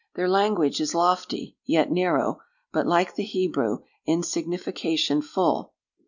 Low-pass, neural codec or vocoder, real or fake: 7.2 kHz; none; real